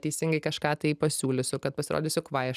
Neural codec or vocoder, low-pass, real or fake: none; 14.4 kHz; real